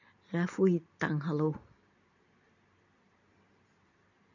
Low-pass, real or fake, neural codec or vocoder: 7.2 kHz; real; none